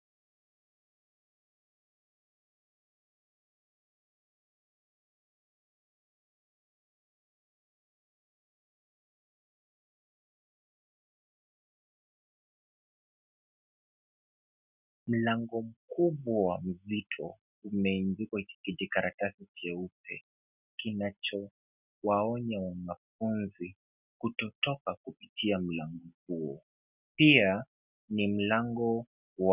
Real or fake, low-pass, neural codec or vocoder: real; 3.6 kHz; none